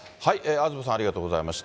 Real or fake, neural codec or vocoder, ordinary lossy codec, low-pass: real; none; none; none